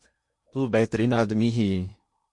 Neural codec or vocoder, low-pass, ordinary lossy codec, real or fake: codec, 16 kHz in and 24 kHz out, 0.8 kbps, FocalCodec, streaming, 65536 codes; 10.8 kHz; MP3, 48 kbps; fake